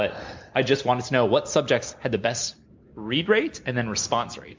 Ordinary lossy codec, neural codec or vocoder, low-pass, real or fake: MP3, 64 kbps; none; 7.2 kHz; real